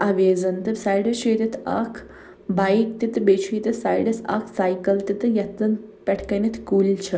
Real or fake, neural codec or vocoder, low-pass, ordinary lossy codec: real; none; none; none